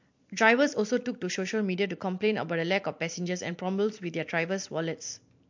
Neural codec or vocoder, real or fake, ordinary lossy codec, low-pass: vocoder, 44.1 kHz, 128 mel bands every 256 samples, BigVGAN v2; fake; MP3, 48 kbps; 7.2 kHz